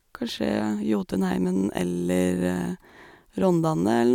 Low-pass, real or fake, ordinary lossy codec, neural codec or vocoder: 19.8 kHz; real; none; none